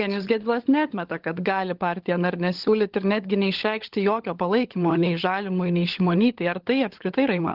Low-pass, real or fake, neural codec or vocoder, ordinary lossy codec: 5.4 kHz; fake; codec, 16 kHz, 16 kbps, FunCodec, trained on LibriTTS, 50 frames a second; Opus, 16 kbps